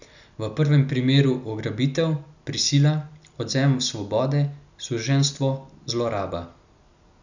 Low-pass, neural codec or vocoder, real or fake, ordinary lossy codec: 7.2 kHz; none; real; none